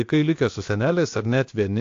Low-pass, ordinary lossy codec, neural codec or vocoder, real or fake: 7.2 kHz; AAC, 48 kbps; codec, 16 kHz, about 1 kbps, DyCAST, with the encoder's durations; fake